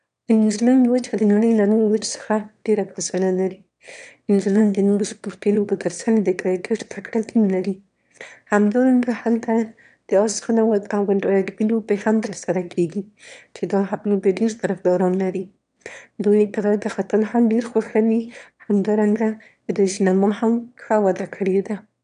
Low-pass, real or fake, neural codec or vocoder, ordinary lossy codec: 9.9 kHz; fake; autoencoder, 22.05 kHz, a latent of 192 numbers a frame, VITS, trained on one speaker; none